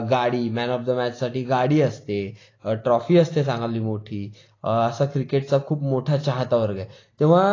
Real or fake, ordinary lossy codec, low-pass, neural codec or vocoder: real; AAC, 32 kbps; 7.2 kHz; none